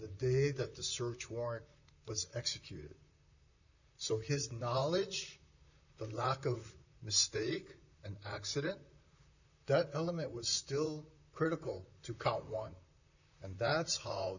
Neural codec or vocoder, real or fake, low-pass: vocoder, 44.1 kHz, 128 mel bands, Pupu-Vocoder; fake; 7.2 kHz